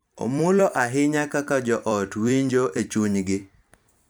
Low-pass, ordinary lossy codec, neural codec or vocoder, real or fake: none; none; vocoder, 44.1 kHz, 128 mel bands every 256 samples, BigVGAN v2; fake